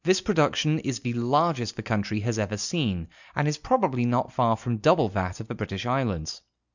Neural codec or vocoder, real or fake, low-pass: none; real; 7.2 kHz